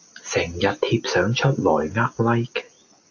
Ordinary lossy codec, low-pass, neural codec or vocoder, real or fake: AAC, 48 kbps; 7.2 kHz; none; real